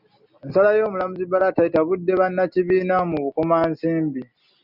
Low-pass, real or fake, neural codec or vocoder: 5.4 kHz; real; none